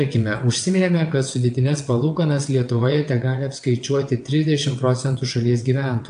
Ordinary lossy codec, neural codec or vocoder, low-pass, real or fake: AAC, 64 kbps; vocoder, 22.05 kHz, 80 mel bands, WaveNeXt; 9.9 kHz; fake